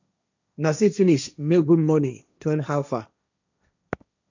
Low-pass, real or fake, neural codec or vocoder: 7.2 kHz; fake; codec, 16 kHz, 1.1 kbps, Voila-Tokenizer